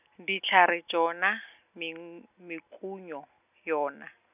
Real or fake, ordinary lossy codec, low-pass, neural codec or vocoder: real; none; 3.6 kHz; none